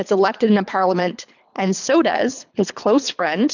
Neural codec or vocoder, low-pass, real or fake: codec, 24 kHz, 3 kbps, HILCodec; 7.2 kHz; fake